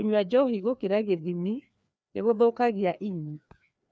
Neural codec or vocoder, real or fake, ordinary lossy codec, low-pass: codec, 16 kHz, 2 kbps, FreqCodec, larger model; fake; none; none